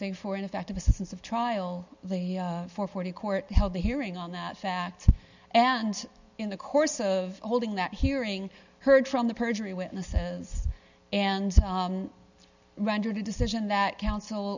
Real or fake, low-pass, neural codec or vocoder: real; 7.2 kHz; none